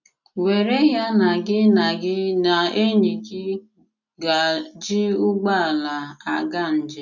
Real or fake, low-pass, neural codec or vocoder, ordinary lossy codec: real; 7.2 kHz; none; none